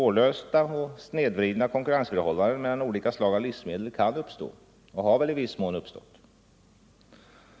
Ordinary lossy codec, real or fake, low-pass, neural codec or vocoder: none; real; none; none